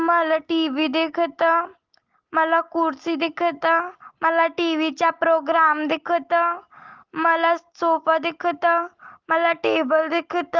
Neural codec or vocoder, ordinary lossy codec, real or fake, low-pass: none; Opus, 16 kbps; real; 7.2 kHz